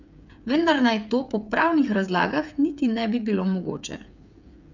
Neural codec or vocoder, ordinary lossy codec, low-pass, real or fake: codec, 16 kHz, 8 kbps, FreqCodec, smaller model; none; 7.2 kHz; fake